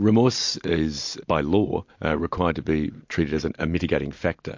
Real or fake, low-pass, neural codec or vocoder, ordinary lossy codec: real; 7.2 kHz; none; MP3, 48 kbps